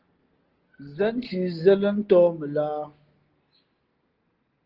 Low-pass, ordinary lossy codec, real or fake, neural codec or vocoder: 5.4 kHz; Opus, 16 kbps; real; none